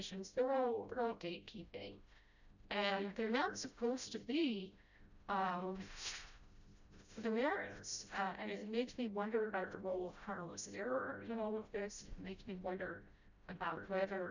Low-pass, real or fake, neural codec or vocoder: 7.2 kHz; fake; codec, 16 kHz, 0.5 kbps, FreqCodec, smaller model